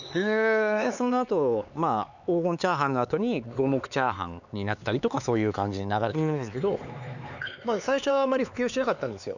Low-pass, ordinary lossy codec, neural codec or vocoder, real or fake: 7.2 kHz; none; codec, 16 kHz, 4 kbps, X-Codec, HuBERT features, trained on LibriSpeech; fake